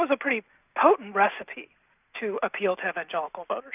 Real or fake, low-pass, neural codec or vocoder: real; 3.6 kHz; none